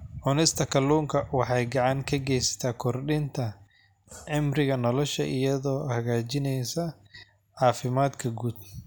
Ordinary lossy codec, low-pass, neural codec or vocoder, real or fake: none; none; none; real